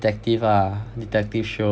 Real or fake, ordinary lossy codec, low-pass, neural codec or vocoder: real; none; none; none